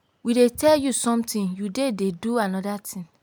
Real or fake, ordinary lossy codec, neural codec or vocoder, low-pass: real; none; none; none